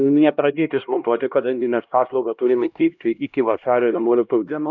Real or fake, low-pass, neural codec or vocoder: fake; 7.2 kHz; codec, 16 kHz, 1 kbps, X-Codec, HuBERT features, trained on LibriSpeech